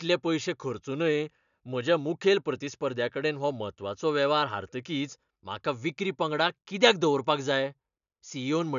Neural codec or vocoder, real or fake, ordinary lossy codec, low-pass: none; real; none; 7.2 kHz